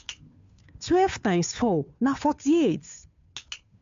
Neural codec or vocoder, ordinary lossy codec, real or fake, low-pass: codec, 16 kHz, 2 kbps, FunCodec, trained on Chinese and English, 25 frames a second; MP3, 64 kbps; fake; 7.2 kHz